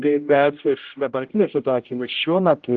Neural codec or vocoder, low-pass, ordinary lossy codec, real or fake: codec, 16 kHz, 0.5 kbps, X-Codec, HuBERT features, trained on general audio; 7.2 kHz; Opus, 32 kbps; fake